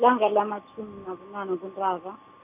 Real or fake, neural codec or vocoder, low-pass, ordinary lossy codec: real; none; 3.6 kHz; none